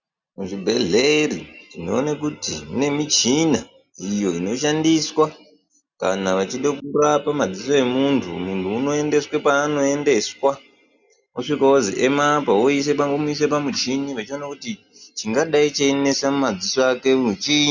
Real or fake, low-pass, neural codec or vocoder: real; 7.2 kHz; none